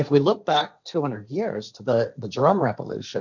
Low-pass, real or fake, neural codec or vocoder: 7.2 kHz; fake; codec, 16 kHz, 1.1 kbps, Voila-Tokenizer